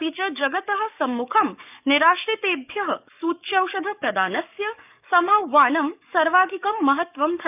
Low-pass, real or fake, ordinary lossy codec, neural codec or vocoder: 3.6 kHz; fake; none; codec, 44.1 kHz, 7.8 kbps, DAC